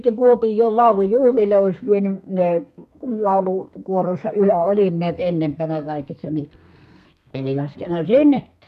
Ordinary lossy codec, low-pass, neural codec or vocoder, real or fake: none; 14.4 kHz; codec, 32 kHz, 1.9 kbps, SNAC; fake